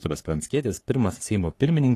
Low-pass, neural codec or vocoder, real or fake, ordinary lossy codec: 14.4 kHz; codec, 44.1 kHz, 3.4 kbps, Pupu-Codec; fake; AAC, 48 kbps